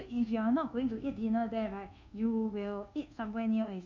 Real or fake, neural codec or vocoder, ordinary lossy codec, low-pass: fake; codec, 24 kHz, 1.2 kbps, DualCodec; MP3, 64 kbps; 7.2 kHz